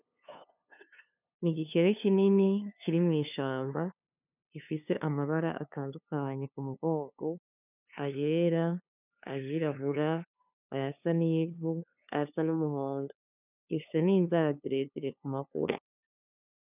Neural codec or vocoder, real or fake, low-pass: codec, 16 kHz, 2 kbps, FunCodec, trained on LibriTTS, 25 frames a second; fake; 3.6 kHz